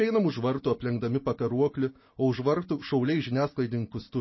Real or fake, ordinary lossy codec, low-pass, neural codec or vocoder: real; MP3, 24 kbps; 7.2 kHz; none